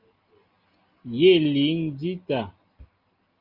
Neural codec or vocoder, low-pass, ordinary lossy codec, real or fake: none; 5.4 kHz; Opus, 32 kbps; real